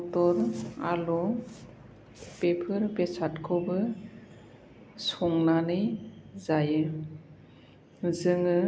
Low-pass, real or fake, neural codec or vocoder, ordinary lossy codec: none; real; none; none